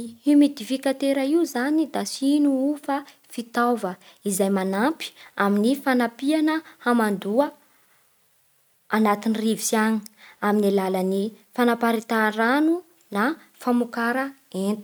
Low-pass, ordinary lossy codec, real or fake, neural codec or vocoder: none; none; real; none